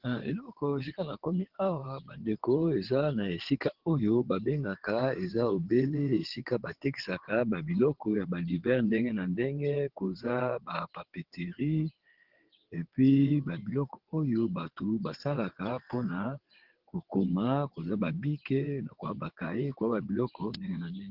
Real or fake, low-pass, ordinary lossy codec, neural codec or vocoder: fake; 5.4 kHz; Opus, 16 kbps; vocoder, 22.05 kHz, 80 mel bands, WaveNeXt